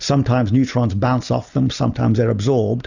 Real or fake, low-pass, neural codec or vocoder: real; 7.2 kHz; none